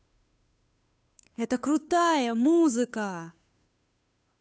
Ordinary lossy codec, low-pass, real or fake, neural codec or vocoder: none; none; fake; codec, 16 kHz, 2 kbps, FunCodec, trained on Chinese and English, 25 frames a second